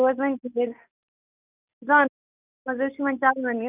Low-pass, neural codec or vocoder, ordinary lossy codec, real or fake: 3.6 kHz; none; none; real